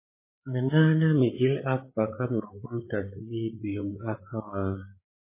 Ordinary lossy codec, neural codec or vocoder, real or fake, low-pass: MP3, 16 kbps; codec, 16 kHz, 8 kbps, FreqCodec, larger model; fake; 3.6 kHz